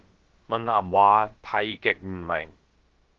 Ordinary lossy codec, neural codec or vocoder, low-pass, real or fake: Opus, 16 kbps; codec, 16 kHz, about 1 kbps, DyCAST, with the encoder's durations; 7.2 kHz; fake